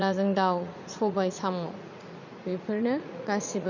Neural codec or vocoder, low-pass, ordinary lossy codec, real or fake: vocoder, 44.1 kHz, 80 mel bands, Vocos; 7.2 kHz; none; fake